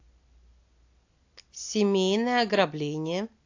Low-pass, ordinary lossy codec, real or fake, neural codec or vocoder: 7.2 kHz; AAC, 48 kbps; real; none